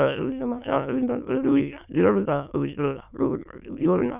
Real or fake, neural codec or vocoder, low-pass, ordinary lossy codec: fake; autoencoder, 22.05 kHz, a latent of 192 numbers a frame, VITS, trained on many speakers; 3.6 kHz; none